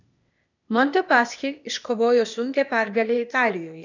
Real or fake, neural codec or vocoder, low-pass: fake; codec, 16 kHz, 0.8 kbps, ZipCodec; 7.2 kHz